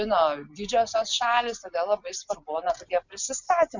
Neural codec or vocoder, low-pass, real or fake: none; 7.2 kHz; real